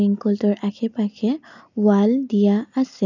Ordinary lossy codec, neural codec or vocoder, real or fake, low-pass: none; none; real; 7.2 kHz